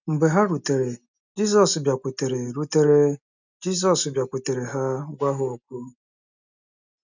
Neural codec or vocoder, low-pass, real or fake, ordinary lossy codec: none; 7.2 kHz; real; none